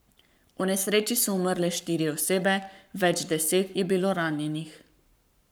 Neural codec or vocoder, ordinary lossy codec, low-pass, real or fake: codec, 44.1 kHz, 7.8 kbps, Pupu-Codec; none; none; fake